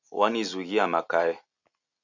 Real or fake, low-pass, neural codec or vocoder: real; 7.2 kHz; none